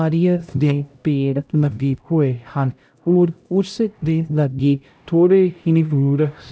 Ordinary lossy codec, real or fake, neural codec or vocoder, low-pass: none; fake; codec, 16 kHz, 0.5 kbps, X-Codec, HuBERT features, trained on LibriSpeech; none